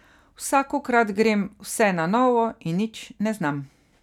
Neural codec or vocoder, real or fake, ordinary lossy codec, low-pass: none; real; none; 19.8 kHz